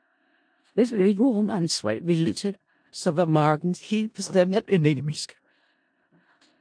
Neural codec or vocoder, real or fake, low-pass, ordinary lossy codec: codec, 16 kHz in and 24 kHz out, 0.4 kbps, LongCat-Audio-Codec, four codebook decoder; fake; 9.9 kHz; AAC, 64 kbps